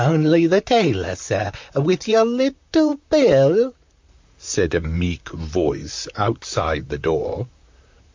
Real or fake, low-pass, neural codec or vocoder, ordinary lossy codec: fake; 7.2 kHz; vocoder, 44.1 kHz, 128 mel bands, Pupu-Vocoder; MP3, 64 kbps